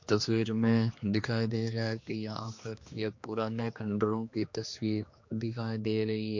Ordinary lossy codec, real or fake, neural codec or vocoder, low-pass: MP3, 48 kbps; fake; codec, 16 kHz, 2 kbps, X-Codec, HuBERT features, trained on general audio; 7.2 kHz